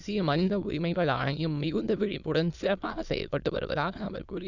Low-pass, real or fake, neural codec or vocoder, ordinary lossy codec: 7.2 kHz; fake; autoencoder, 22.05 kHz, a latent of 192 numbers a frame, VITS, trained on many speakers; none